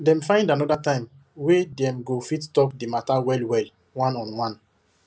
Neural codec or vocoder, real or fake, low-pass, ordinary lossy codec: none; real; none; none